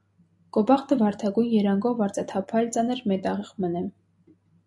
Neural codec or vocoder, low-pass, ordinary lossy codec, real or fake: none; 10.8 kHz; AAC, 64 kbps; real